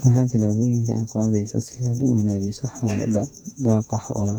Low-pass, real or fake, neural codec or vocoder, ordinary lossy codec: 19.8 kHz; fake; codec, 44.1 kHz, 2.6 kbps, DAC; none